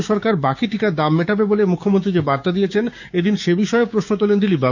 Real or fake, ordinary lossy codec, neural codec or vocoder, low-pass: fake; none; codec, 16 kHz, 6 kbps, DAC; 7.2 kHz